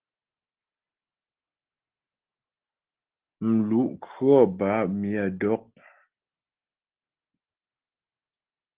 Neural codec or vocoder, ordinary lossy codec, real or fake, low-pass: none; Opus, 16 kbps; real; 3.6 kHz